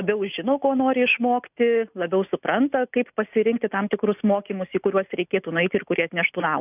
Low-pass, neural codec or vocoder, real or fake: 3.6 kHz; none; real